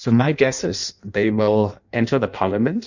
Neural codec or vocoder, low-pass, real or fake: codec, 16 kHz in and 24 kHz out, 0.6 kbps, FireRedTTS-2 codec; 7.2 kHz; fake